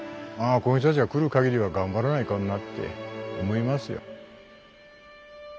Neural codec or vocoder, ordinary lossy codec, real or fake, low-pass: none; none; real; none